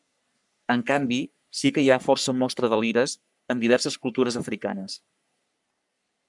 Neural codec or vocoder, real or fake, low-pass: codec, 44.1 kHz, 3.4 kbps, Pupu-Codec; fake; 10.8 kHz